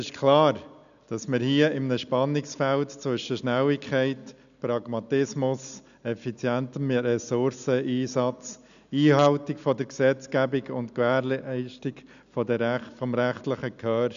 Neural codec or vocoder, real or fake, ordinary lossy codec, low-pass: none; real; none; 7.2 kHz